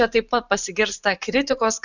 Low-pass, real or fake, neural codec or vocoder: 7.2 kHz; fake; vocoder, 44.1 kHz, 128 mel bands every 512 samples, BigVGAN v2